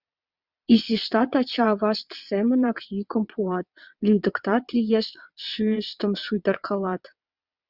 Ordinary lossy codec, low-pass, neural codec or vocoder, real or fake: Opus, 64 kbps; 5.4 kHz; vocoder, 22.05 kHz, 80 mel bands, WaveNeXt; fake